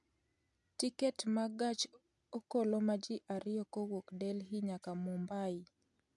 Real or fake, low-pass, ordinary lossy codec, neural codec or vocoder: real; 10.8 kHz; none; none